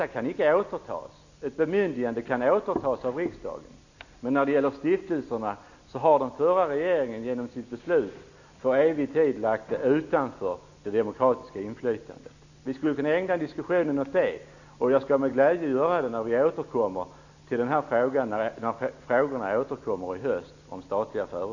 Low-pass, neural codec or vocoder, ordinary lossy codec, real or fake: 7.2 kHz; none; none; real